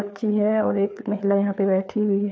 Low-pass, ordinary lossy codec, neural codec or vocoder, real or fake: none; none; codec, 16 kHz, 4 kbps, FreqCodec, larger model; fake